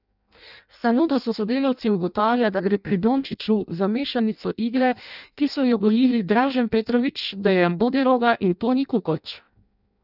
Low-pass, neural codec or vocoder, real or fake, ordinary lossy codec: 5.4 kHz; codec, 16 kHz in and 24 kHz out, 0.6 kbps, FireRedTTS-2 codec; fake; none